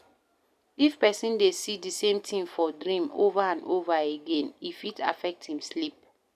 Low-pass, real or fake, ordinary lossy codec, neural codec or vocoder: 14.4 kHz; real; none; none